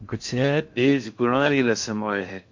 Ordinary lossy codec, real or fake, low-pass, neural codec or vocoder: MP3, 48 kbps; fake; 7.2 kHz; codec, 16 kHz in and 24 kHz out, 0.6 kbps, FocalCodec, streaming, 4096 codes